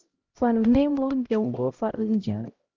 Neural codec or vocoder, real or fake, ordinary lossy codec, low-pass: codec, 16 kHz, 0.5 kbps, X-Codec, HuBERT features, trained on LibriSpeech; fake; Opus, 32 kbps; 7.2 kHz